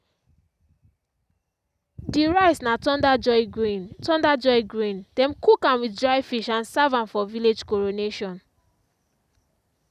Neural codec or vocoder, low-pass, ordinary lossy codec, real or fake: none; 14.4 kHz; none; real